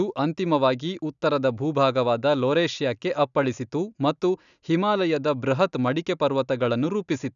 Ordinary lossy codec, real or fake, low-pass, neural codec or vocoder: none; real; 7.2 kHz; none